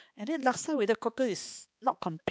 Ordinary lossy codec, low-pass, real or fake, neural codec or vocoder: none; none; fake; codec, 16 kHz, 2 kbps, X-Codec, HuBERT features, trained on balanced general audio